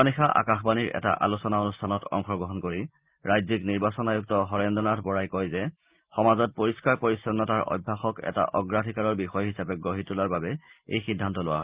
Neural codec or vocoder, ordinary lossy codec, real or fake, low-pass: none; Opus, 32 kbps; real; 3.6 kHz